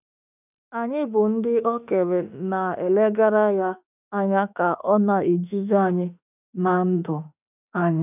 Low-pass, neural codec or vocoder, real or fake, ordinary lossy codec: 3.6 kHz; autoencoder, 48 kHz, 32 numbers a frame, DAC-VAE, trained on Japanese speech; fake; none